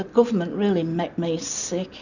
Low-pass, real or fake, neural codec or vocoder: 7.2 kHz; real; none